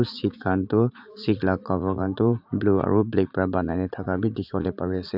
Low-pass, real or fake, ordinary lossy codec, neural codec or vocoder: 5.4 kHz; fake; AAC, 48 kbps; vocoder, 44.1 kHz, 80 mel bands, Vocos